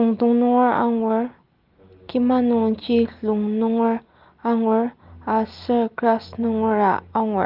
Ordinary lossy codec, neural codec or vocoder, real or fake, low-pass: Opus, 32 kbps; none; real; 5.4 kHz